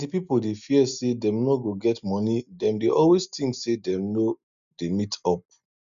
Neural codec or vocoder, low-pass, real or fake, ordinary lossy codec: none; 7.2 kHz; real; none